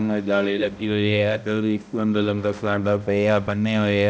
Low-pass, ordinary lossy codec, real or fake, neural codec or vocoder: none; none; fake; codec, 16 kHz, 0.5 kbps, X-Codec, HuBERT features, trained on balanced general audio